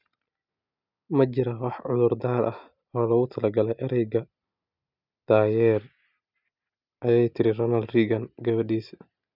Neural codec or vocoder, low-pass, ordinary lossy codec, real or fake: none; 5.4 kHz; none; real